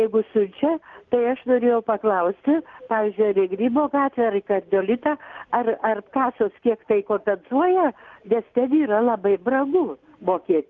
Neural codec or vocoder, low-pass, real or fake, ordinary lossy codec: none; 7.2 kHz; real; Opus, 24 kbps